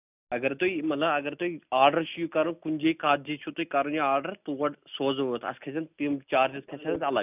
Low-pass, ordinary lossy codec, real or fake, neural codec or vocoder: 3.6 kHz; none; real; none